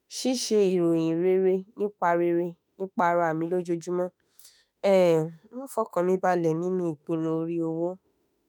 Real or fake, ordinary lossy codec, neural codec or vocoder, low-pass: fake; none; autoencoder, 48 kHz, 32 numbers a frame, DAC-VAE, trained on Japanese speech; none